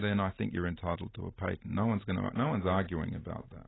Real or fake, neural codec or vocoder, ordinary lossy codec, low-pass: real; none; AAC, 16 kbps; 7.2 kHz